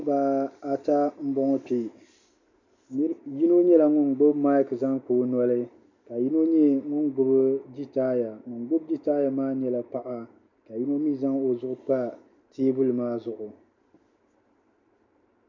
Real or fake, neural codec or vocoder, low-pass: real; none; 7.2 kHz